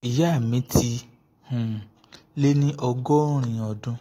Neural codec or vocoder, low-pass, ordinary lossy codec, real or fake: none; 19.8 kHz; AAC, 48 kbps; real